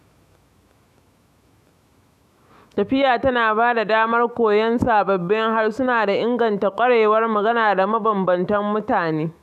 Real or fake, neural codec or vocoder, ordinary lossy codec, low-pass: fake; autoencoder, 48 kHz, 128 numbers a frame, DAC-VAE, trained on Japanese speech; none; 14.4 kHz